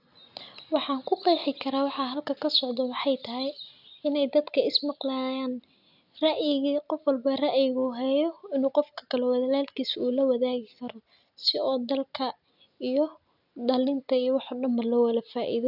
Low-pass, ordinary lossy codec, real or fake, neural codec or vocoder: 5.4 kHz; none; real; none